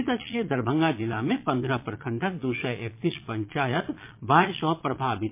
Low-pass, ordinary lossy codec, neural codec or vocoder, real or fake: 3.6 kHz; MP3, 24 kbps; vocoder, 22.05 kHz, 80 mel bands, Vocos; fake